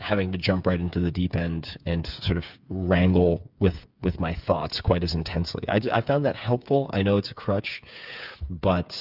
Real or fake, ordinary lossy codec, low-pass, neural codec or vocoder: fake; Opus, 64 kbps; 5.4 kHz; codec, 16 kHz, 8 kbps, FreqCodec, smaller model